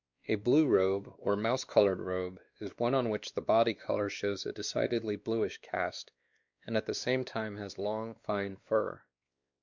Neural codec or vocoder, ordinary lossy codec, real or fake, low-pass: codec, 16 kHz, 2 kbps, X-Codec, WavLM features, trained on Multilingual LibriSpeech; Opus, 64 kbps; fake; 7.2 kHz